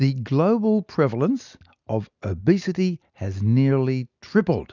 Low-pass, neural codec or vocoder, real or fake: 7.2 kHz; none; real